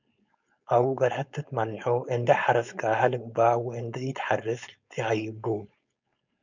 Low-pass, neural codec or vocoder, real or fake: 7.2 kHz; codec, 16 kHz, 4.8 kbps, FACodec; fake